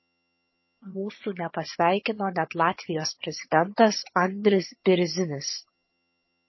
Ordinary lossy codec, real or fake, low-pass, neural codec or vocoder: MP3, 24 kbps; fake; 7.2 kHz; vocoder, 22.05 kHz, 80 mel bands, HiFi-GAN